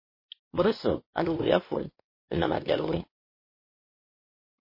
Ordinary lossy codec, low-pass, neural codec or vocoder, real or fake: MP3, 24 kbps; 5.4 kHz; codec, 16 kHz, 2 kbps, X-Codec, WavLM features, trained on Multilingual LibriSpeech; fake